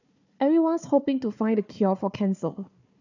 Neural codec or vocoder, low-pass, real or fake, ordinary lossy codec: codec, 16 kHz, 4 kbps, FunCodec, trained on Chinese and English, 50 frames a second; 7.2 kHz; fake; none